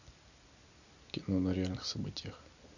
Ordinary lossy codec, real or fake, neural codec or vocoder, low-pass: none; real; none; 7.2 kHz